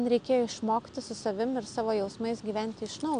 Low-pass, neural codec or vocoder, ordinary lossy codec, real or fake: 9.9 kHz; none; MP3, 64 kbps; real